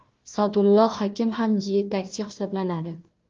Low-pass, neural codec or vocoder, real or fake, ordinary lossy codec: 7.2 kHz; codec, 16 kHz, 1 kbps, FunCodec, trained on Chinese and English, 50 frames a second; fake; Opus, 32 kbps